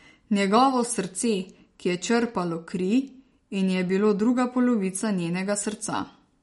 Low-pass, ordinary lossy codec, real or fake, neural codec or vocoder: 19.8 kHz; MP3, 48 kbps; real; none